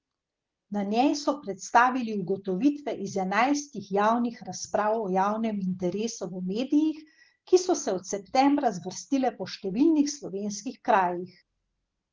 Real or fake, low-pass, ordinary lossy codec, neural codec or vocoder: real; 7.2 kHz; Opus, 16 kbps; none